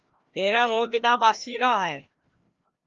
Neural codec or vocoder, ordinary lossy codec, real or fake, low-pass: codec, 16 kHz, 1 kbps, FreqCodec, larger model; Opus, 32 kbps; fake; 7.2 kHz